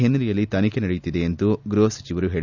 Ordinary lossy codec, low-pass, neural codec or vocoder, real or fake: none; 7.2 kHz; none; real